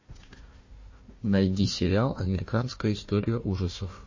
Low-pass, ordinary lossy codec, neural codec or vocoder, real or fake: 7.2 kHz; MP3, 32 kbps; codec, 16 kHz, 1 kbps, FunCodec, trained on Chinese and English, 50 frames a second; fake